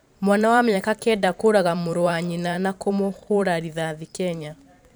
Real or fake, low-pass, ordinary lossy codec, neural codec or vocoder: fake; none; none; vocoder, 44.1 kHz, 128 mel bands every 512 samples, BigVGAN v2